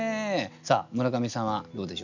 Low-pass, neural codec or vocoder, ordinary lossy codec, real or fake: 7.2 kHz; none; none; real